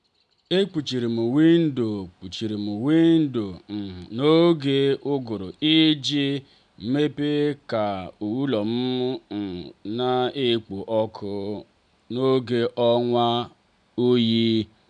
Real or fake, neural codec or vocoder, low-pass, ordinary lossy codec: real; none; 9.9 kHz; none